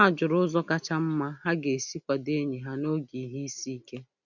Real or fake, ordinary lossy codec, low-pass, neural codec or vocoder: real; none; 7.2 kHz; none